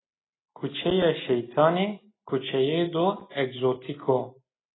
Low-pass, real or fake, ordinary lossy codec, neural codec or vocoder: 7.2 kHz; real; AAC, 16 kbps; none